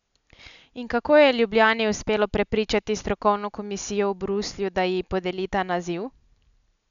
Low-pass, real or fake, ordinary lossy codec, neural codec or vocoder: 7.2 kHz; real; none; none